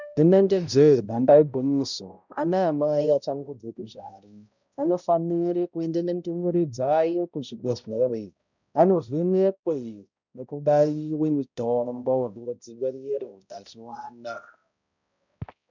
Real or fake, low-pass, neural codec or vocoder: fake; 7.2 kHz; codec, 16 kHz, 0.5 kbps, X-Codec, HuBERT features, trained on balanced general audio